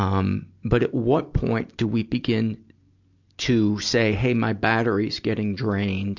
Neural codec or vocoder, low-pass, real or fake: none; 7.2 kHz; real